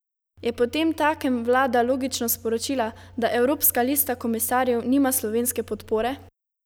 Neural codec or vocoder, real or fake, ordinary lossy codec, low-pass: none; real; none; none